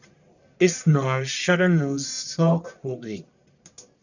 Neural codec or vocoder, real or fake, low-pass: codec, 44.1 kHz, 1.7 kbps, Pupu-Codec; fake; 7.2 kHz